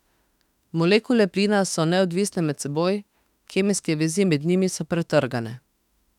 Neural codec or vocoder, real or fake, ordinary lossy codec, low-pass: autoencoder, 48 kHz, 32 numbers a frame, DAC-VAE, trained on Japanese speech; fake; none; 19.8 kHz